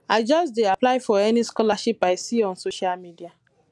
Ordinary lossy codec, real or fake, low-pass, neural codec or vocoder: none; real; none; none